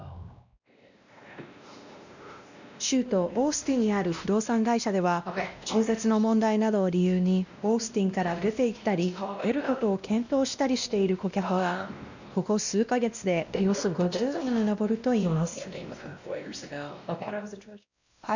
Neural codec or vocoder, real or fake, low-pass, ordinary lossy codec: codec, 16 kHz, 1 kbps, X-Codec, WavLM features, trained on Multilingual LibriSpeech; fake; 7.2 kHz; none